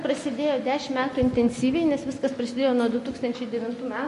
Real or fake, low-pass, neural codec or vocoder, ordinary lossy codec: real; 10.8 kHz; none; MP3, 48 kbps